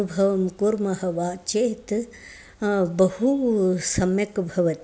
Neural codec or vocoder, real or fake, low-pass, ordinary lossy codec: none; real; none; none